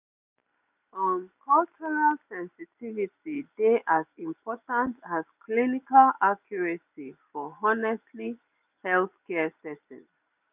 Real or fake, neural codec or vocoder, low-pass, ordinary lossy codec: real; none; 3.6 kHz; none